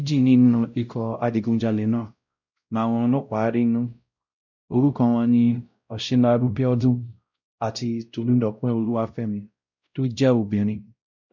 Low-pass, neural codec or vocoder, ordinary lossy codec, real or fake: 7.2 kHz; codec, 16 kHz, 0.5 kbps, X-Codec, WavLM features, trained on Multilingual LibriSpeech; none; fake